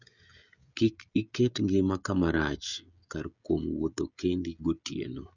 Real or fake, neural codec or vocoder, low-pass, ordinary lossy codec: fake; codec, 16 kHz, 16 kbps, FreqCodec, smaller model; 7.2 kHz; none